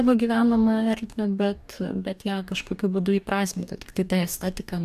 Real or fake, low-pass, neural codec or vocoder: fake; 14.4 kHz; codec, 44.1 kHz, 2.6 kbps, DAC